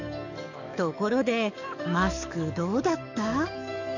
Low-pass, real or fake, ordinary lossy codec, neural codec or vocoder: 7.2 kHz; fake; none; codec, 44.1 kHz, 7.8 kbps, DAC